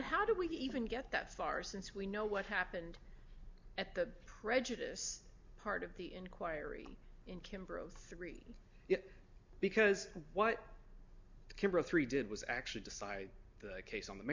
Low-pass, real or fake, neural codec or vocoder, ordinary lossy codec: 7.2 kHz; real; none; MP3, 64 kbps